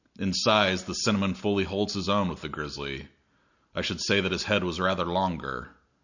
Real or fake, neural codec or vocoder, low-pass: real; none; 7.2 kHz